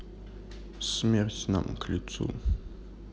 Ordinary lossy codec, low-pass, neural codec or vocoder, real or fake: none; none; none; real